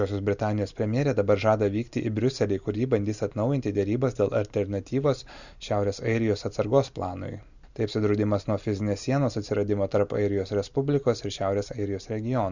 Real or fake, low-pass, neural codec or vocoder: real; 7.2 kHz; none